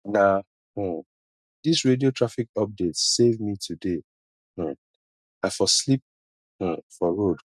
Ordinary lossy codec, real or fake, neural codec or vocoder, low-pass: none; real; none; none